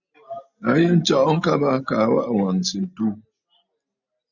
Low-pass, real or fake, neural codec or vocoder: 7.2 kHz; real; none